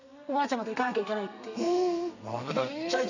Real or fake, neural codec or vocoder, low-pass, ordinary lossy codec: fake; codec, 32 kHz, 1.9 kbps, SNAC; 7.2 kHz; none